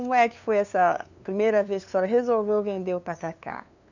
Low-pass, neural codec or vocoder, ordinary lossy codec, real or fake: 7.2 kHz; codec, 16 kHz, 2 kbps, FunCodec, trained on LibriTTS, 25 frames a second; none; fake